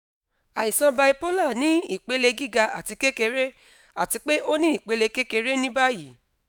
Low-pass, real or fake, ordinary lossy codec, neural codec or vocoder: none; fake; none; autoencoder, 48 kHz, 128 numbers a frame, DAC-VAE, trained on Japanese speech